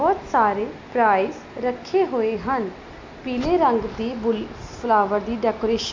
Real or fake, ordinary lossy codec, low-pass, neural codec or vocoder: real; MP3, 48 kbps; 7.2 kHz; none